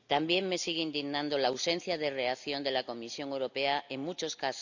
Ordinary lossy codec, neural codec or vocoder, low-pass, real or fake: none; none; 7.2 kHz; real